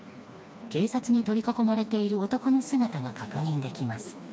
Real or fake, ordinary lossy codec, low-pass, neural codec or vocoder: fake; none; none; codec, 16 kHz, 2 kbps, FreqCodec, smaller model